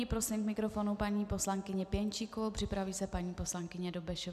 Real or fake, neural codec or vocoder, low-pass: real; none; 14.4 kHz